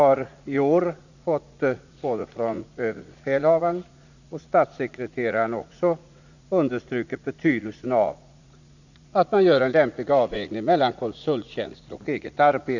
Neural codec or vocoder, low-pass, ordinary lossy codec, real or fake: vocoder, 44.1 kHz, 80 mel bands, Vocos; 7.2 kHz; none; fake